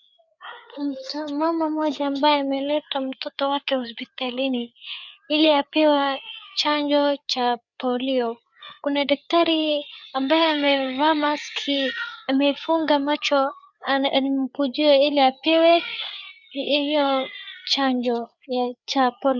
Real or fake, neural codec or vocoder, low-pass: fake; codec, 16 kHz, 4 kbps, FreqCodec, larger model; 7.2 kHz